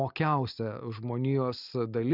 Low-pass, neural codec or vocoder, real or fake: 5.4 kHz; none; real